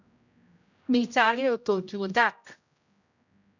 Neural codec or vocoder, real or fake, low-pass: codec, 16 kHz, 0.5 kbps, X-Codec, HuBERT features, trained on general audio; fake; 7.2 kHz